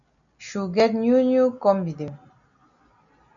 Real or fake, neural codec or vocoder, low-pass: real; none; 7.2 kHz